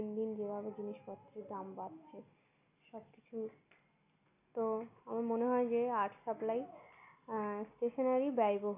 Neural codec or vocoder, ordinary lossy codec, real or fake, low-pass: none; none; real; 3.6 kHz